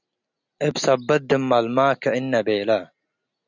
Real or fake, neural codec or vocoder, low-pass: real; none; 7.2 kHz